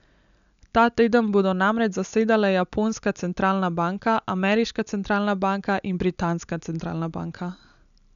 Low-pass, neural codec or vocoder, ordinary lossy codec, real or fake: 7.2 kHz; none; none; real